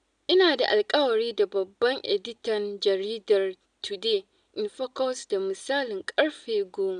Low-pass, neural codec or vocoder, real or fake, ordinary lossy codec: 9.9 kHz; none; real; none